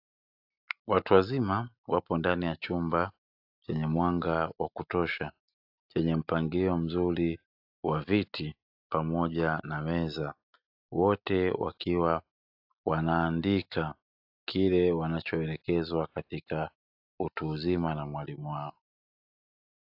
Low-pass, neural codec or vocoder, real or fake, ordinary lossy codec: 5.4 kHz; none; real; AAC, 48 kbps